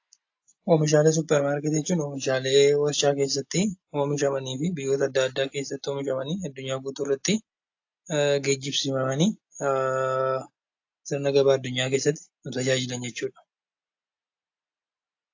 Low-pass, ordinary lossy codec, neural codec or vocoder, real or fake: 7.2 kHz; AAC, 48 kbps; none; real